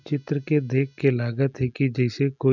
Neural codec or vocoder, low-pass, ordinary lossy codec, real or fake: none; 7.2 kHz; none; real